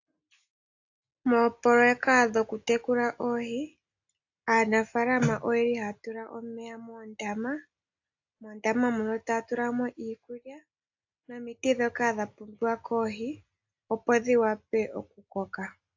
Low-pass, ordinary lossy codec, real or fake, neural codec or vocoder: 7.2 kHz; AAC, 48 kbps; real; none